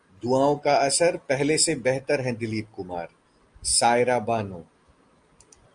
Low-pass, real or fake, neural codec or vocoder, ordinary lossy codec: 9.9 kHz; real; none; Opus, 32 kbps